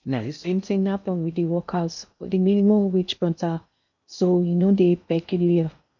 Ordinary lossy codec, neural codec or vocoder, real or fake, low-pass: none; codec, 16 kHz in and 24 kHz out, 0.6 kbps, FocalCodec, streaming, 2048 codes; fake; 7.2 kHz